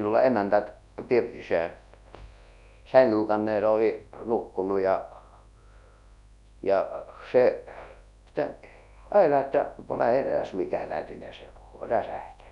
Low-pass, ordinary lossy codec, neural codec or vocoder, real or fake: 10.8 kHz; none; codec, 24 kHz, 0.9 kbps, WavTokenizer, large speech release; fake